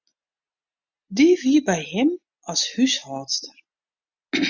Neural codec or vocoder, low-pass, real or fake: none; 7.2 kHz; real